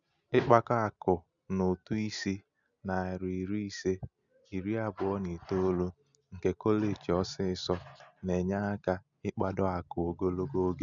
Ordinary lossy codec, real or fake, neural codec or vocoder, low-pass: none; real; none; 7.2 kHz